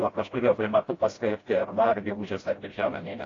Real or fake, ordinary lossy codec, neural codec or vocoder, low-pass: fake; AAC, 32 kbps; codec, 16 kHz, 0.5 kbps, FreqCodec, smaller model; 7.2 kHz